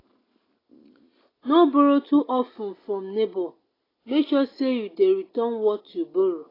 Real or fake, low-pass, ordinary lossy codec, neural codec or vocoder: real; 5.4 kHz; AAC, 24 kbps; none